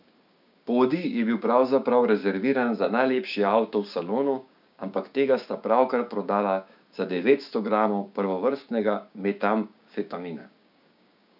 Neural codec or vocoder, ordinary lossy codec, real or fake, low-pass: codec, 16 kHz, 6 kbps, DAC; none; fake; 5.4 kHz